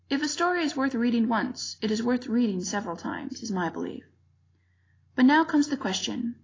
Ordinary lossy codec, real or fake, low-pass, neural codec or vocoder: AAC, 32 kbps; real; 7.2 kHz; none